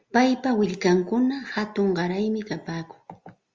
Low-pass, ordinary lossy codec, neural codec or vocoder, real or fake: 7.2 kHz; Opus, 32 kbps; none; real